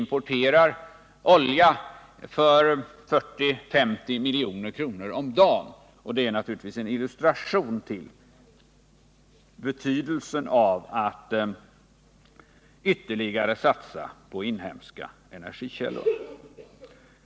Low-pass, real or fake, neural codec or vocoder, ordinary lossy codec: none; real; none; none